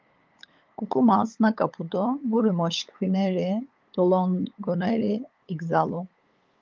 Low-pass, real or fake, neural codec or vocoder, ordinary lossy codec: 7.2 kHz; fake; codec, 16 kHz, 8 kbps, FunCodec, trained on LibriTTS, 25 frames a second; Opus, 32 kbps